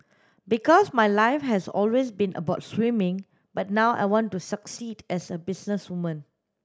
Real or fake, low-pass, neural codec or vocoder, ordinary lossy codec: real; none; none; none